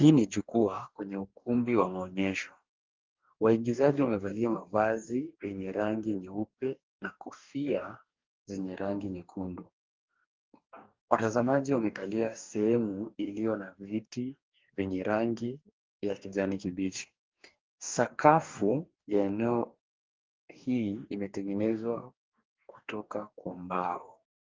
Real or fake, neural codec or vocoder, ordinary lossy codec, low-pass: fake; codec, 44.1 kHz, 2.6 kbps, DAC; Opus, 32 kbps; 7.2 kHz